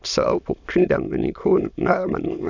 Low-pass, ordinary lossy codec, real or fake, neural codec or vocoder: 7.2 kHz; none; fake; autoencoder, 22.05 kHz, a latent of 192 numbers a frame, VITS, trained on many speakers